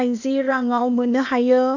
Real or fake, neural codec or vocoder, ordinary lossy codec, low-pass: fake; codec, 16 kHz, 0.8 kbps, ZipCodec; none; 7.2 kHz